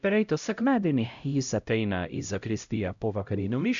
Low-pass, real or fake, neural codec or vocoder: 7.2 kHz; fake; codec, 16 kHz, 0.5 kbps, X-Codec, HuBERT features, trained on LibriSpeech